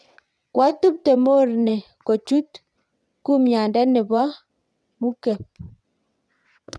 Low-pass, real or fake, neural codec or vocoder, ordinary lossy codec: none; fake; vocoder, 22.05 kHz, 80 mel bands, WaveNeXt; none